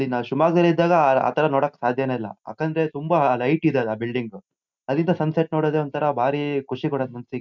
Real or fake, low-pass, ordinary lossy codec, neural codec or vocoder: real; 7.2 kHz; none; none